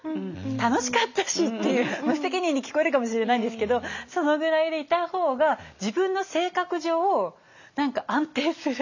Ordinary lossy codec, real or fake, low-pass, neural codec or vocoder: none; real; 7.2 kHz; none